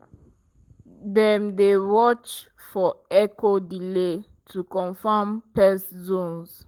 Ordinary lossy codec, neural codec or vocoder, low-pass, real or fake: Opus, 24 kbps; codec, 44.1 kHz, 7.8 kbps, Pupu-Codec; 19.8 kHz; fake